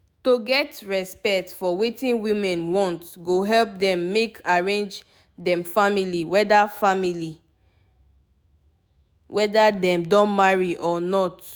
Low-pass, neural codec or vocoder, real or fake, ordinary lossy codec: none; autoencoder, 48 kHz, 128 numbers a frame, DAC-VAE, trained on Japanese speech; fake; none